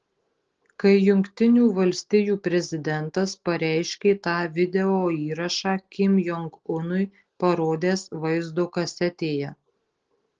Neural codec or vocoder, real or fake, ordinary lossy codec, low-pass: none; real; Opus, 16 kbps; 7.2 kHz